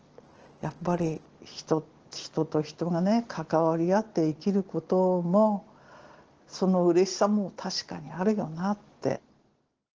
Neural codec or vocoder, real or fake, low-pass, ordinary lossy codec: none; real; 7.2 kHz; Opus, 16 kbps